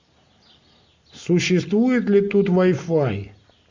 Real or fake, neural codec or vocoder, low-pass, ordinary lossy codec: real; none; 7.2 kHz; MP3, 64 kbps